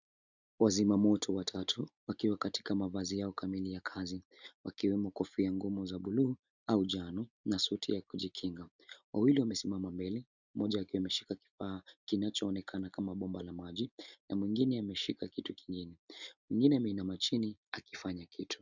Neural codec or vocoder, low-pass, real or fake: none; 7.2 kHz; real